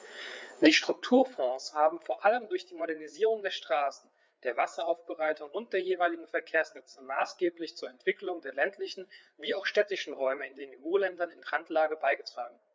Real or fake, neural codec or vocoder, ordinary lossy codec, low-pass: fake; codec, 16 kHz, 4 kbps, FreqCodec, larger model; none; none